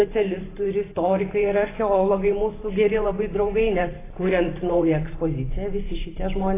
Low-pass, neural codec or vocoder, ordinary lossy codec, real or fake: 3.6 kHz; vocoder, 44.1 kHz, 128 mel bands every 512 samples, BigVGAN v2; AAC, 16 kbps; fake